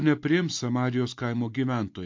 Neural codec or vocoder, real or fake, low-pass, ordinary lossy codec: none; real; 7.2 kHz; MP3, 48 kbps